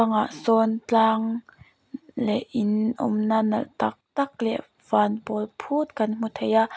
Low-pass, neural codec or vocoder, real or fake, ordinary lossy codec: none; none; real; none